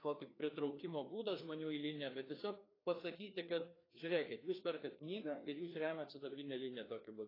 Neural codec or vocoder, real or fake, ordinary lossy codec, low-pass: codec, 16 kHz, 2 kbps, FreqCodec, larger model; fake; AAC, 24 kbps; 5.4 kHz